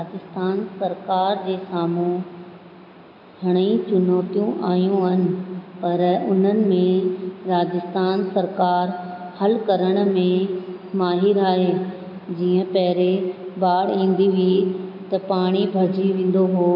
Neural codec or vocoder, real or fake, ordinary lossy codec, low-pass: none; real; none; 5.4 kHz